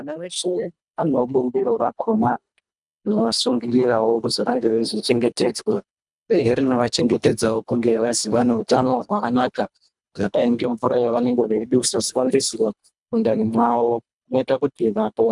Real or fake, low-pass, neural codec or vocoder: fake; 10.8 kHz; codec, 24 kHz, 1.5 kbps, HILCodec